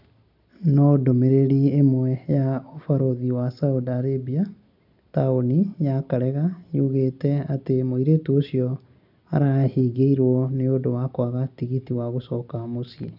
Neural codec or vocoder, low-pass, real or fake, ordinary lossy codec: none; 5.4 kHz; real; none